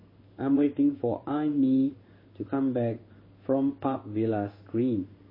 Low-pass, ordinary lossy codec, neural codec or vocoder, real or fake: 5.4 kHz; MP3, 24 kbps; codec, 16 kHz in and 24 kHz out, 1 kbps, XY-Tokenizer; fake